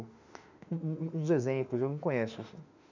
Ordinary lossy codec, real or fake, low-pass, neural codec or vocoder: none; fake; 7.2 kHz; autoencoder, 48 kHz, 32 numbers a frame, DAC-VAE, trained on Japanese speech